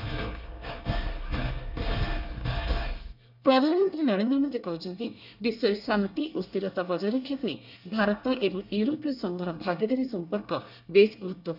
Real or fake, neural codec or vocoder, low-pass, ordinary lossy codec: fake; codec, 24 kHz, 1 kbps, SNAC; 5.4 kHz; none